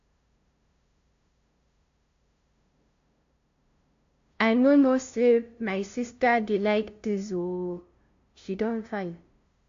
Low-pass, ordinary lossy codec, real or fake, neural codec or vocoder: 7.2 kHz; AAC, 48 kbps; fake; codec, 16 kHz, 0.5 kbps, FunCodec, trained on LibriTTS, 25 frames a second